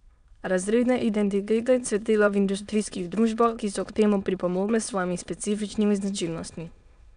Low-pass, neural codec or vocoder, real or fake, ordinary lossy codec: 9.9 kHz; autoencoder, 22.05 kHz, a latent of 192 numbers a frame, VITS, trained on many speakers; fake; MP3, 96 kbps